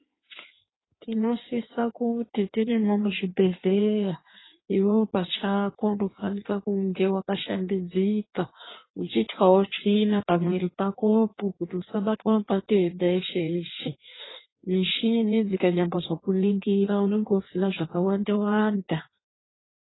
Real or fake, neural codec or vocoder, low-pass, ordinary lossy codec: fake; codec, 16 kHz in and 24 kHz out, 1.1 kbps, FireRedTTS-2 codec; 7.2 kHz; AAC, 16 kbps